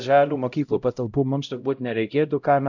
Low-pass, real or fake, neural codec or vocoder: 7.2 kHz; fake; codec, 16 kHz, 0.5 kbps, X-Codec, HuBERT features, trained on LibriSpeech